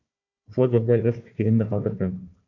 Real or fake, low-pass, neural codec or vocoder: fake; 7.2 kHz; codec, 16 kHz, 1 kbps, FunCodec, trained on Chinese and English, 50 frames a second